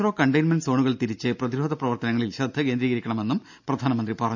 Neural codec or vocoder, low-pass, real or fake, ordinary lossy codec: none; 7.2 kHz; real; none